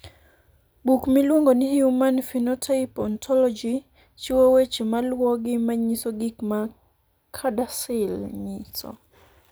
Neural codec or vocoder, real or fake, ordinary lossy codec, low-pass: none; real; none; none